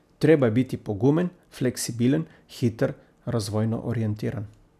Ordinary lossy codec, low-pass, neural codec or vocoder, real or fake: none; 14.4 kHz; none; real